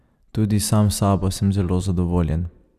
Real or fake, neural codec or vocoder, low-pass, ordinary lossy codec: real; none; 14.4 kHz; none